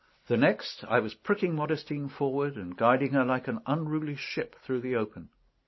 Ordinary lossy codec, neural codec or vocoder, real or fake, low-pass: MP3, 24 kbps; none; real; 7.2 kHz